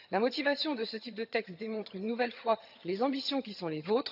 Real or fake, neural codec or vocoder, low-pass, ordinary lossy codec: fake; vocoder, 22.05 kHz, 80 mel bands, HiFi-GAN; 5.4 kHz; AAC, 48 kbps